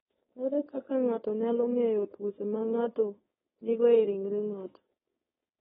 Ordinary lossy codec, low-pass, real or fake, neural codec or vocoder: AAC, 16 kbps; 7.2 kHz; fake; codec, 16 kHz, 4.8 kbps, FACodec